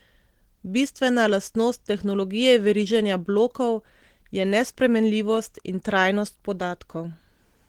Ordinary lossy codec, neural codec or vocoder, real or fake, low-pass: Opus, 16 kbps; none; real; 19.8 kHz